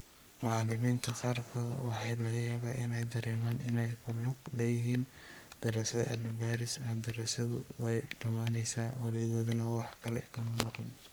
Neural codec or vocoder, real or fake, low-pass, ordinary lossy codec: codec, 44.1 kHz, 3.4 kbps, Pupu-Codec; fake; none; none